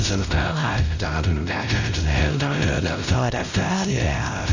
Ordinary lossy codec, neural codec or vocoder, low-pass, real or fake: Opus, 64 kbps; codec, 16 kHz, 0.5 kbps, X-Codec, WavLM features, trained on Multilingual LibriSpeech; 7.2 kHz; fake